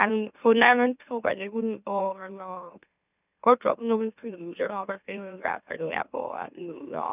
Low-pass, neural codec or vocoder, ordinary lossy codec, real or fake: 3.6 kHz; autoencoder, 44.1 kHz, a latent of 192 numbers a frame, MeloTTS; none; fake